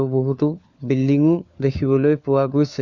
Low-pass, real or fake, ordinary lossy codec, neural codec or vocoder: 7.2 kHz; fake; Opus, 64 kbps; codec, 16 kHz, 4 kbps, FunCodec, trained on LibriTTS, 50 frames a second